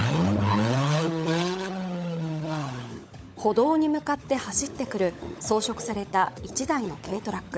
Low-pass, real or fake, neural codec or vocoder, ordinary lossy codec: none; fake; codec, 16 kHz, 16 kbps, FunCodec, trained on LibriTTS, 50 frames a second; none